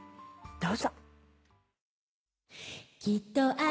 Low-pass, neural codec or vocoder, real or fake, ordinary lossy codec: none; none; real; none